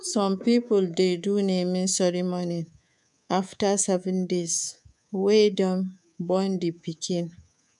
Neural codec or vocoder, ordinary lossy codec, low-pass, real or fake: codec, 24 kHz, 3.1 kbps, DualCodec; MP3, 96 kbps; 10.8 kHz; fake